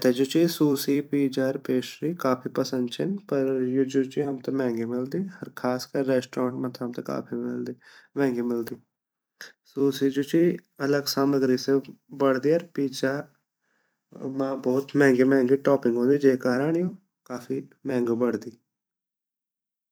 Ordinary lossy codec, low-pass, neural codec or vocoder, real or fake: none; none; vocoder, 44.1 kHz, 128 mel bands every 256 samples, BigVGAN v2; fake